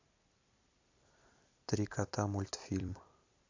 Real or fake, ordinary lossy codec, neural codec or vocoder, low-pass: fake; AAC, 48 kbps; vocoder, 44.1 kHz, 128 mel bands every 256 samples, BigVGAN v2; 7.2 kHz